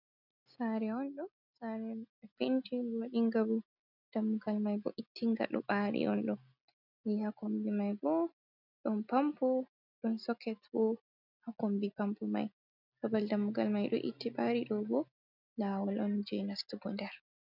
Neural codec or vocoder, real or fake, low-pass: none; real; 5.4 kHz